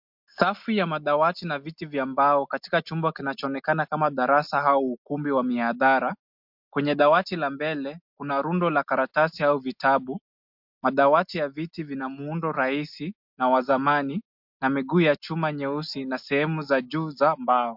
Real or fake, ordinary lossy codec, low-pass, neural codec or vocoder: real; MP3, 48 kbps; 5.4 kHz; none